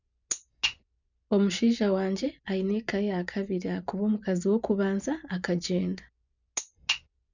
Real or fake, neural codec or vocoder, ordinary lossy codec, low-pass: fake; vocoder, 24 kHz, 100 mel bands, Vocos; none; 7.2 kHz